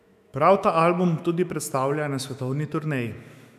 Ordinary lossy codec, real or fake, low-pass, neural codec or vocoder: none; fake; 14.4 kHz; autoencoder, 48 kHz, 128 numbers a frame, DAC-VAE, trained on Japanese speech